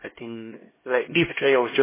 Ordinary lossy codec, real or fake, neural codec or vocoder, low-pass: MP3, 16 kbps; fake; codec, 16 kHz, 1 kbps, X-Codec, HuBERT features, trained on LibriSpeech; 3.6 kHz